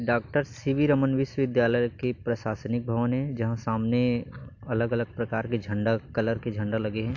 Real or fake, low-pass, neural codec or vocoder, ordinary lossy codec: real; 7.2 kHz; none; AAC, 48 kbps